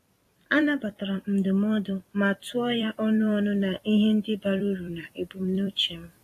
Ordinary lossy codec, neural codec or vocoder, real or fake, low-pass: AAC, 48 kbps; vocoder, 44.1 kHz, 128 mel bands every 256 samples, BigVGAN v2; fake; 14.4 kHz